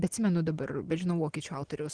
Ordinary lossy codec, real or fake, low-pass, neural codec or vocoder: Opus, 16 kbps; real; 9.9 kHz; none